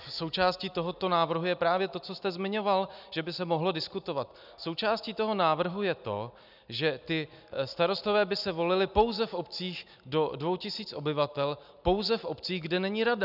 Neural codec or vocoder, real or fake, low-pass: none; real; 5.4 kHz